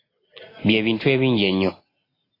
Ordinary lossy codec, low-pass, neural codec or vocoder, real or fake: AAC, 24 kbps; 5.4 kHz; none; real